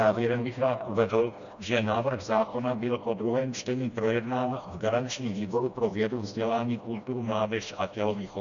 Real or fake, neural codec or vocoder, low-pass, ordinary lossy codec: fake; codec, 16 kHz, 1 kbps, FreqCodec, smaller model; 7.2 kHz; MP3, 96 kbps